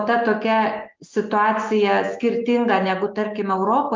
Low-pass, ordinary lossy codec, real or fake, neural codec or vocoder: 7.2 kHz; Opus, 24 kbps; real; none